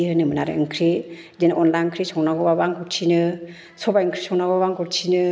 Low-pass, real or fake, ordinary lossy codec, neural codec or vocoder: none; real; none; none